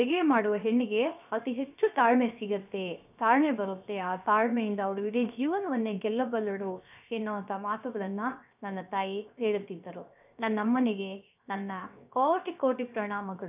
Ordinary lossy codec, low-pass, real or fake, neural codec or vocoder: none; 3.6 kHz; fake; codec, 16 kHz, 0.7 kbps, FocalCodec